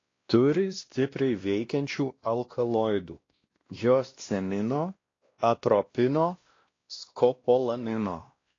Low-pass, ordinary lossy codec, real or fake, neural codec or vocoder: 7.2 kHz; AAC, 32 kbps; fake; codec, 16 kHz, 1 kbps, X-Codec, WavLM features, trained on Multilingual LibriSpeech